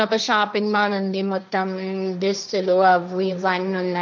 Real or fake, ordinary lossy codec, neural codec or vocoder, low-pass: fake; none; codec, 16 kHz, 1.1 kbps, Voila-Tokenizer; none